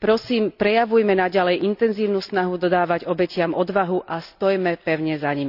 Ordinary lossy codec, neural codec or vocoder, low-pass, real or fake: none; none; 5.4 kHz; real